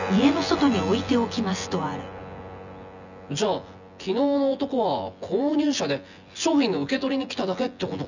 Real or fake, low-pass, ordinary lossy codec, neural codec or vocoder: fake; 7.2 kHz; none; vocoder, 24 kHz, 100 mel bands, Vocos